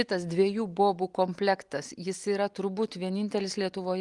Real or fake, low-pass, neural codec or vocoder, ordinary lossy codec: real; 10.8 kHz; none; Opus, 24 kbps